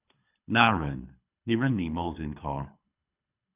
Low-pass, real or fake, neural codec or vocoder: 3.6 kHz; fake; codec, 24 kHz, 3 kbps, HILCodec